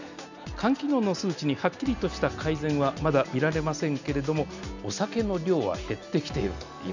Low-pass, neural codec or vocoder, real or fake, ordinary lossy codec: 7.2 kHz; none; real; none